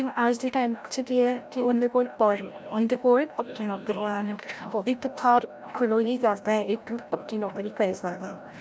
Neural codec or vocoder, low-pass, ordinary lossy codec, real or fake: codec, 16 kHz, 0.5 kbps, FreqCodec, larger model; none; none; fake